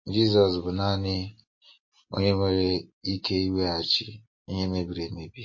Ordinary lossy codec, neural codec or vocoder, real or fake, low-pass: MP3, 32 kbps; none; real; 7.2 kHz